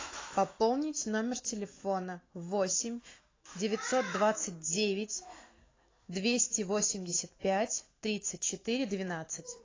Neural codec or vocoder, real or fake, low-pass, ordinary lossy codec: autoencoder, 48 kHz, 128 numbers a frame, DAC-VAE, trained on Japanese speech; fake; 7.2 kHz; AAC, 32 kbps